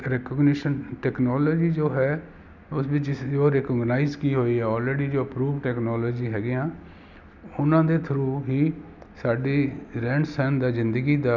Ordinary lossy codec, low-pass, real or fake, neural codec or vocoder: none; 7.2 kHz; real; none